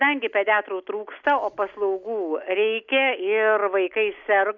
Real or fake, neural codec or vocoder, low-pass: real; none; 7.2 kHz